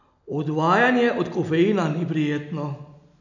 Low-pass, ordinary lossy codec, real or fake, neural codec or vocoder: 7.2 kHz; none; real; none